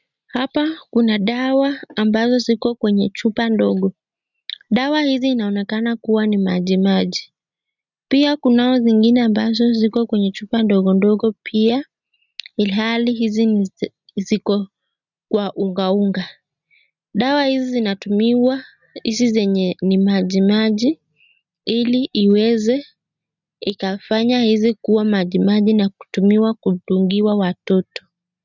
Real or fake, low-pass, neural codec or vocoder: real; 7.2 kHz; none